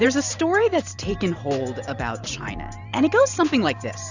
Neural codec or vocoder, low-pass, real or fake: vocoder, 44.1 kHz, 128 mel bands every 512 samples, BigVGAN v2; 7.2 kHz; fake